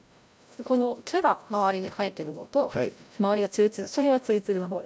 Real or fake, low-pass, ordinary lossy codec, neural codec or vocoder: fake; none; none; codec, 16 kHz, 0.5 kbps, FreqCodec, larger model